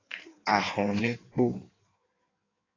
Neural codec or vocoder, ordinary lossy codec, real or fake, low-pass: codec, 16 kHz in and 24 kHz out, 1.1 kbps, FireRedTTS-2 codec; AAC, 32 kbps; fake; 7.2 kHz